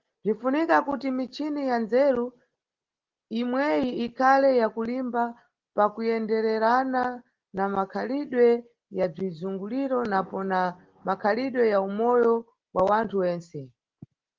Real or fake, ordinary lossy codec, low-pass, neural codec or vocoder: real; Opus, 16 kbps; 7.2 kHz; none